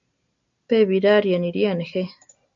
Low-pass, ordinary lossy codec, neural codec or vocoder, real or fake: 7.2 kHz; MP3, 64 kbps; none; real